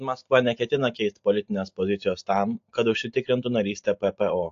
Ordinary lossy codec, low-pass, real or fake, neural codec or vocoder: AAC, 48 kbps; 7.2 kHz; real; none